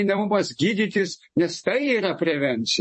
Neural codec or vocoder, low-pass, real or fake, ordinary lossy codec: vocoder, 44.1 kHz, 128 mel bands every 256 samples, BigVGAN v2; 10.8 kHz; fake; MP3, 32 kbps